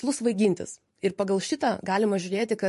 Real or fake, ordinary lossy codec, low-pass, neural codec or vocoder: fake; MP3, 48 kbps; 14.4 kHz; vocoder, 44.1 kHz, 128 mel bands every 512 samples, BigVGAN v2